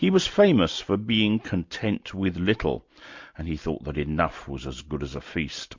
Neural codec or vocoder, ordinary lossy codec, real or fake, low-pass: none; MP3, 48 kbps; real; 7.2 kHz